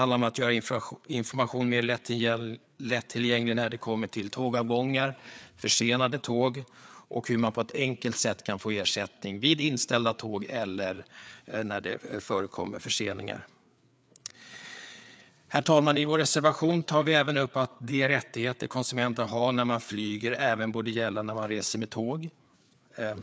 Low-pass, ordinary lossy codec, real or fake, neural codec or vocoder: none; none; fake; codec, 16 kHz, 4 kbps, FreqCodec, larger model